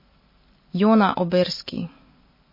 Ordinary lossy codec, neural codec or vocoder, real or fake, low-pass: MP3, 24 kbps; none; real; 5.4 kHz